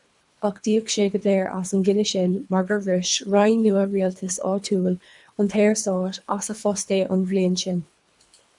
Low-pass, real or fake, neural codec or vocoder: 10.8 kHz; fake; codec, 24 kHz, 3 kbps, HILCodec